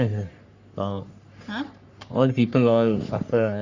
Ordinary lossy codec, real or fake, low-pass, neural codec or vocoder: Opus, 64 kbps; fake; 7.2 kHz; codec, 44.1 kHz, 3.4 kbps, Pupu-Codec